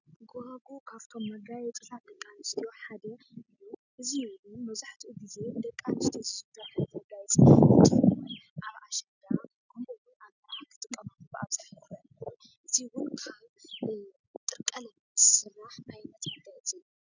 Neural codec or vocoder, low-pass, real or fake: none; 7.2 kHz; real